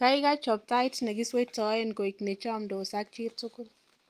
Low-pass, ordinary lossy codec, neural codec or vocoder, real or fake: 14.4 kHz; Opus, 32 kbps; none; real